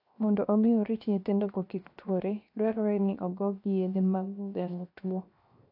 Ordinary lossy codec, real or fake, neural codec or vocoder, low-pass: AAC, 32 kbps; fake; codec, 16 kHz, 0.7 kbps, FocalCodec; 5.4 kHz